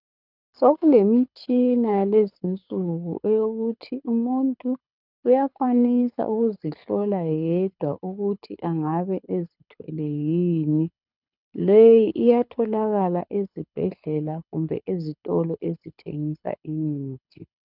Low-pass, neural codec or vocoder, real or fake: 5.4 kHz; codec, 24 kHz, 6 kbps, HILCodec; fake